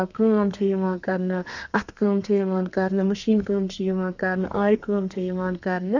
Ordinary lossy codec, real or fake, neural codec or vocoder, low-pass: none; fake; codec, 32 kHz, 1.9 kbps, SNAC; 7.2 kHz